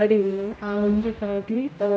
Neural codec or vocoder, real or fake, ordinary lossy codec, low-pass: codec, 16 kHz, 0.5 kbps, X-Codec, HuBERT features, trained on general audio; fake; none; none